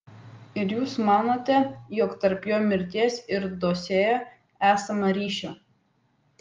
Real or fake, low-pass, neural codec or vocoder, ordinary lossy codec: real; 7.2 kHz; none; Opus, 32 kbps